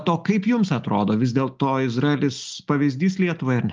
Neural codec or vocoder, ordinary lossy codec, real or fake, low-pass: none; Opus, 24 kbps; real; 7.2 kHz